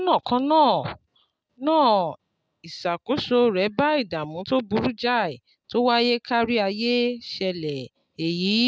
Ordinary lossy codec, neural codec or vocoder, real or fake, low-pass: none; none; real; none